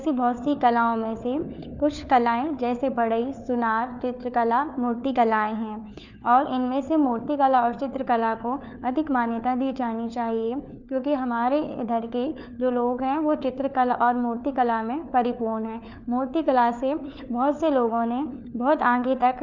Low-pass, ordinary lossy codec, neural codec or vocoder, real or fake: 7.2 kHz; none; codec, 16 kHz, 4 kbps, FunCodec, trained on LibriTTS, 50 frames a second; fake